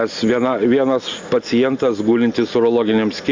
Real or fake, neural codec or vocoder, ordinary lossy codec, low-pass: real; none; MP3, 64 kbps; 7.2 kHz